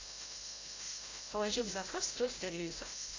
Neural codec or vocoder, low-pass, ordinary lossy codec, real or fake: codec, 16 kHz, 0.5 kbps, FreqCodec, larger model; 7.2 kHz; AAC, 32 kbps; fake